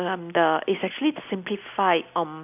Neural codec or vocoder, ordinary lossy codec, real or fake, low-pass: none; none; real; 3.6 kHz